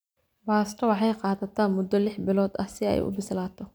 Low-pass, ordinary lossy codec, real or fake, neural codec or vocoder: none; none; real; none